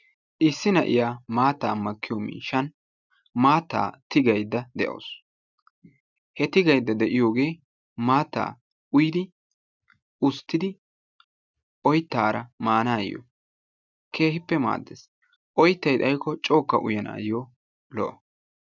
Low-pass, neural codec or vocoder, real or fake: 7.2 kHz; none; real